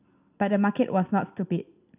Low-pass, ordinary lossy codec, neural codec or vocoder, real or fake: 3.6 kHz; none; none; real